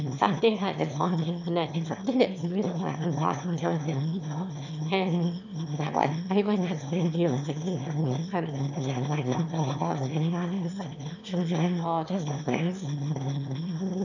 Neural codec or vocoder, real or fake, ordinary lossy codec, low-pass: autoencoder, 22.05 kHz, a latent of 192 numbers a frame, VITS, trained on one speaker; fake; none; 7.2 kHz